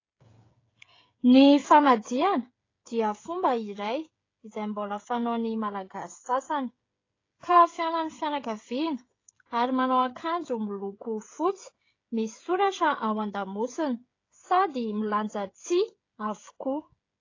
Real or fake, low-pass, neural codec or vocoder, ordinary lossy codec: fake; 7.2 kHz; codec, 16 kHz, 16 kbps, FreqCodec, smaller model; AAC, 32 kbps